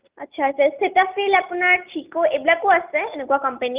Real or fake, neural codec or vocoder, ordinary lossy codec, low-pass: real; none; Opus, 24 kbps; 3.6 kHz